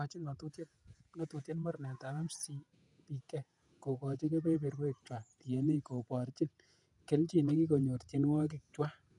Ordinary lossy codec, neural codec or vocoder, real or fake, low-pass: none; vocoder, 48 kHz, 128 mel bands, Vocos; fake; 10.8 kHz